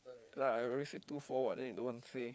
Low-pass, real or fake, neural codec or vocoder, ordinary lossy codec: none; fake; codec, 16 kHz, 4 kbps, FreqCodec, larger model; none